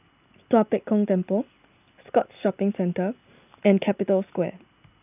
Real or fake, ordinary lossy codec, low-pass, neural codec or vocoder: real; none; 3.6 kHz; none